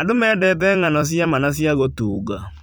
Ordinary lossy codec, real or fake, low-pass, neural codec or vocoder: none; fake; none; vocoder, 44.1 kHz, 128 mel bands every 256 samples, BigVGAN v2